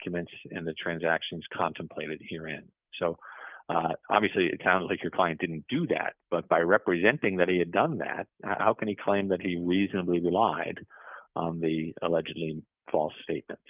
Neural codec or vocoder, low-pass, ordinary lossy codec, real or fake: none; 3.6 kHz; Opus, 24 kbps; real